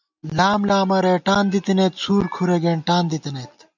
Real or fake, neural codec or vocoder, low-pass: real; none; 7.2 kHz